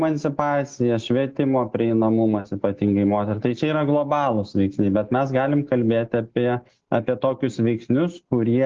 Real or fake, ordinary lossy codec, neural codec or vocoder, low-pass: real; Opus, 16 kbps; none; 7.2 kHz